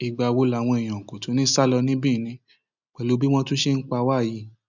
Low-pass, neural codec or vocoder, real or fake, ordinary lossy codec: 7.2 kHz; none; real; none